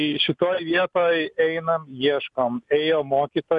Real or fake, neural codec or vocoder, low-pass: real; none; 10.8 kHz